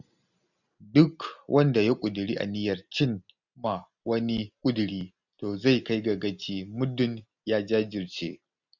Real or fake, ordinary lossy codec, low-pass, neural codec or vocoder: real; none; 7.2 kHz; none